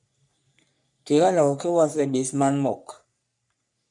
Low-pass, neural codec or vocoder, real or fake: 10.8 kHz; codec, 44.1 kHz, 3.4 kbps, Pupu-Codec; fake